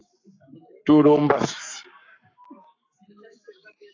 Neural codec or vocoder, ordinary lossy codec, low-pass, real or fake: codec, 44.1 kHz, 7.8 kbps, Pupu-Codec; AAC, 32 kbps; 7.2 kHz; fake